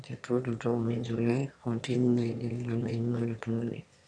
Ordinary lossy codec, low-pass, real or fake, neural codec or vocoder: none; 9.9 kHz; fake; autoencoder, 22.05 kHz, a latent of 192 numbers a frame, VITS, trained on one speaker